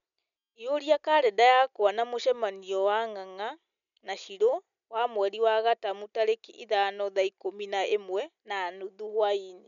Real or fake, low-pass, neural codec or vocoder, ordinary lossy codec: real; 7.2 kHz; none; none